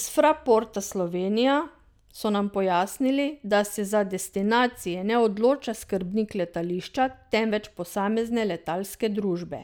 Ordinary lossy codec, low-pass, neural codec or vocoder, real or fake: none; none; none; real